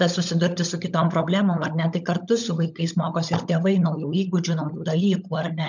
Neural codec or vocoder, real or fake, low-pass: codec, 16 kHz, 16 kbps, FunCodec, trained on LibriTTS, 50 frames a second; fake; 7.2 kHz